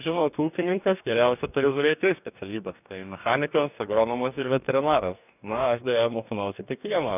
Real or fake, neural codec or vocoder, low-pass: fake; codec, 44.1 kHz, 2.6 kbps, DAC; 3.6 kHz